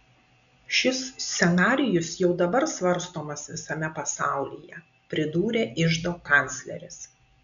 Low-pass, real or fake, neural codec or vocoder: 7.2 kHz; real; none